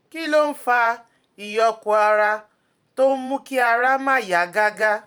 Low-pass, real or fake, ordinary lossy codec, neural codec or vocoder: 19.8 kHz; fake; none; vocoder, 44.1 kHz, 128 mel bands every 512 samples, BigVGAN v2